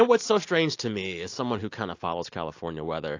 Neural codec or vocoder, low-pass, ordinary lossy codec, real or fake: none; 7.2 kHz; AAC, 48 kbps; real